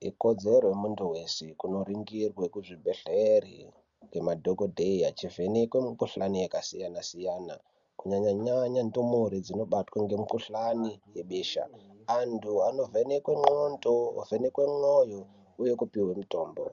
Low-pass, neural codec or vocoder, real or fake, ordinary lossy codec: 7.2 kHz; none; real; Opus, 64 kbps